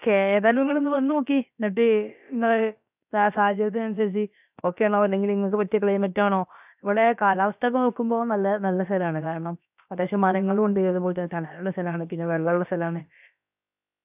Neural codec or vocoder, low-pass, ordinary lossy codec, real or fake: codec, 16 kHz, about 1 kbps, DyCAST, with the encoder's durations; 3.6 kHz; none; fake